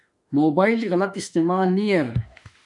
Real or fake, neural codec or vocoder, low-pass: fake; autoencoder, 48 kHz, 32 numbers a frame, DAC-VAE, trained on Japanese speech; 10.8 kHz